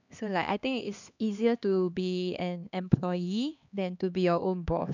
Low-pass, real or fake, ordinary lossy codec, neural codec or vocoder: 7.2 kHz; fake; none; codec, 16 kHz, 2 kbps, X-Codec, HuBERT features, trained on LibriSpeech